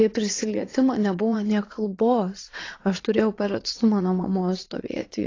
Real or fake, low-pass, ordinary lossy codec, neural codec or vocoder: fake; 7.2 kHz; AAC, 32 kbps; vocoder, 22.05 kHz, 80 mel bands, WaveNeXt